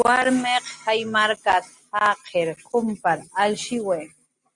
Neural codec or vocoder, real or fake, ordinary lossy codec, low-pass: none; real; Opus, 64 kbps; 10.8 kHz